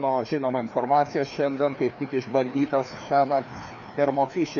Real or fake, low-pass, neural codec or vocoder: fake; 7.2 kHz; codec, 16 kHz, 2 kbps, FreqCodec, larger model